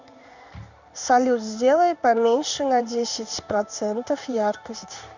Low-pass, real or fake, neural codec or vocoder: 7.2 kHz; fake; codec, 16 kHz in and 24 kHz out, 1 kbps, XY-Tokenizer